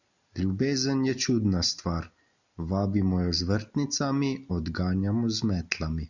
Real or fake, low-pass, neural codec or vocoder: real; 7.2 kHz; none